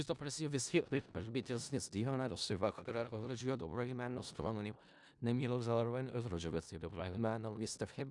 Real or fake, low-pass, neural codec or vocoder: fake; 10.8 kHz; codec, 16 kHz in and 24 kHz out, 0.4 kbps, LongCat-Audio-Codec, four codebook decoder